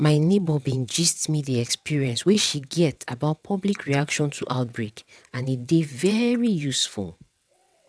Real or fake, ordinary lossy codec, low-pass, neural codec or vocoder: fake; none; none; vocoder, 22.05 kHz, 80 mel bands, WaveNeXt